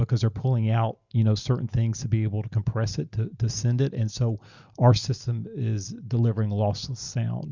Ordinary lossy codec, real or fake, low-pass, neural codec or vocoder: Opus, 64 kbps; real; 7.2 kHz; none